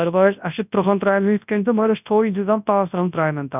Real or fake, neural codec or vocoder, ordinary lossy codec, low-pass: fake; codec, 24 kHz, 0.9 kbps, WavTokenizer, large speech release; none; 3.6 kHz